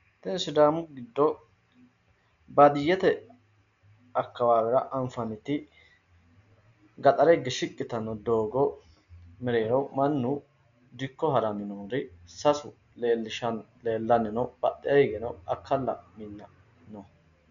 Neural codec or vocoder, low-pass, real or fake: none; 7.2 kHz; real